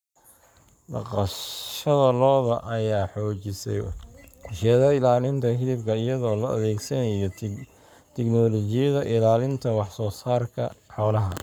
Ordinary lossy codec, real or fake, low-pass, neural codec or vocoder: none; fake; none; codec, 44.1 kHz, 7.8 kbps, Pupu-Codec